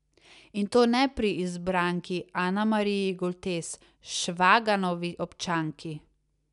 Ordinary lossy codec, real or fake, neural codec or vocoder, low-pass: none; real; none; 10.8 kHz